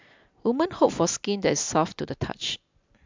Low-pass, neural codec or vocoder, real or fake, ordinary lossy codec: 7.2 kHz; none; real; MP3, 64 kbps